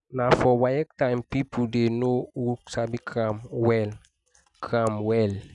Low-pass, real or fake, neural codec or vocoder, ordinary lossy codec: 10.8 kHz; real; none; none